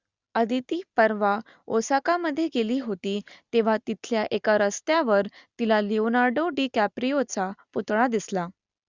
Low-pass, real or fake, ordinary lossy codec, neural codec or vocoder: 7.2 kHz; real; Opus, 64 kbps; none